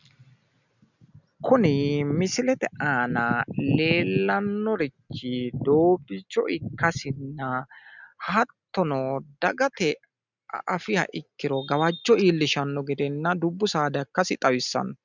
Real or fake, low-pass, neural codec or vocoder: real; 7.2 kHz; none